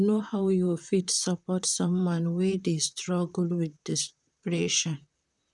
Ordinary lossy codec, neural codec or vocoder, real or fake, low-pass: none; vocoder, 44.1 kHz, 128 mel bands, Pupu-Vocoder; fake; 10.8 kHz